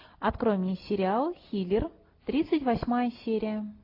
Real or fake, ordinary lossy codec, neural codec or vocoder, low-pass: real; AAC, 32 kbps; none; 5.4 kHz